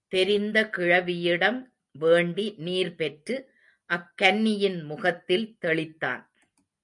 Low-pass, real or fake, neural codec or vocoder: 10.8 kHz; real; none